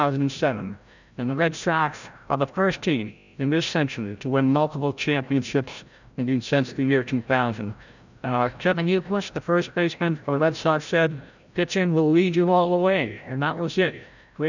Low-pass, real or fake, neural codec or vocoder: 7.2 kHz; fake; codec, 16 kHz, 0.5 kbps, FreqCodec, larger model